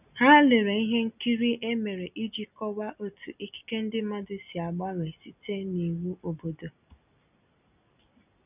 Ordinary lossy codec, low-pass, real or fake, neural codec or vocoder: none; 3.6 kHz; real; none